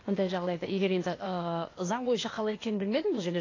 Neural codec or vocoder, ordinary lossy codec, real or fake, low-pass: codec, 16 kHz, 0.8 kbps, ZipCodec; AAC, 32 kbps; fake; 7.2 kHz